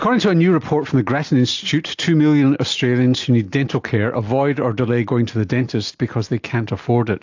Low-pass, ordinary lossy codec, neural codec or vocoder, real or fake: 7.2 kHz; AAC, 48 kbps; none; real